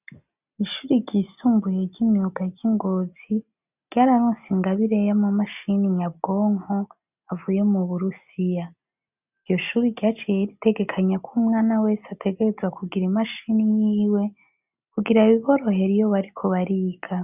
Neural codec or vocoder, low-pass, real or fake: none; 3.6 kHz; real